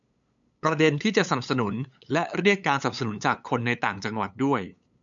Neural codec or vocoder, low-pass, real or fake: codec, 16 kHz, 8 kbps, FunCodec, trained on LibriTTS, 25 frames a second; 7.2 kHz; fake